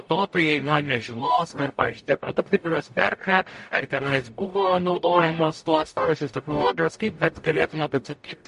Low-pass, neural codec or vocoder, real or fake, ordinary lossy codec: 14.4 kHz; codec, 44.1 kHz, 0.9 kbps, DAC; fake; MP3, 48 kbps